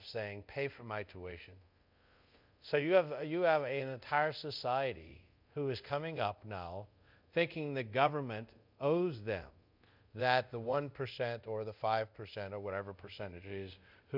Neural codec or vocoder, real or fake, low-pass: codec, 24 kHz, 0.5 kbps, DualCodec; fake; 5.4 kHz